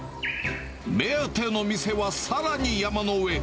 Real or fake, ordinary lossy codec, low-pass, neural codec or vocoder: real; none; none; none